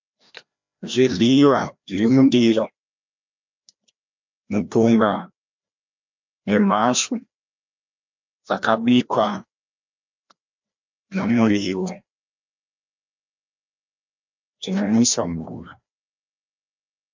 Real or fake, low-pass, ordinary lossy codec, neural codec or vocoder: fake; 7.2 kHz; MP3, 64 kbps; codec, 16 kHz, 1 kbps, FreqCodec, larger model